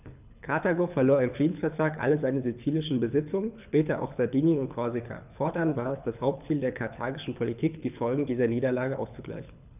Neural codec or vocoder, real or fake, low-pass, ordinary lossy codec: codec, 24 kHz, 6 kbps, HILCodec; fake; 3.6 kHz; none